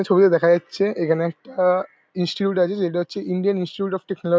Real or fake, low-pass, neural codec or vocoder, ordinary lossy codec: real; none; none; none